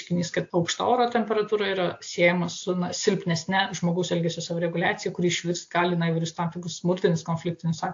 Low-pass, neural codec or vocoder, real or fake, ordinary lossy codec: 7.2 kHz; none; real; AAC, 48 kbps